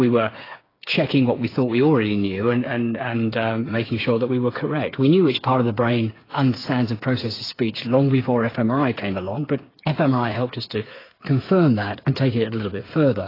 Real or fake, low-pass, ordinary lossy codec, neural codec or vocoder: fake; 5.4 kHz; AAC, 24 kbps; codec, 16 kHz, 8 kbps, FreqCodec, smaller model